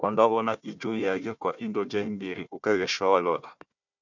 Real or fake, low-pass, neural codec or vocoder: fake; 7.2 kHz; codec, 16 kHz, 1 kbps, FunCodec, trained on Chinese and English, 50 frames a second